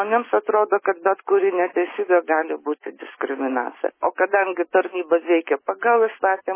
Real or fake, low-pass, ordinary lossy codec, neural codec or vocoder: real; 3.6 kHz; MP3, 16 kbps; none